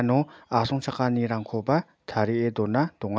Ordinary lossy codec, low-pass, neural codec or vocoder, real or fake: none; none; none; real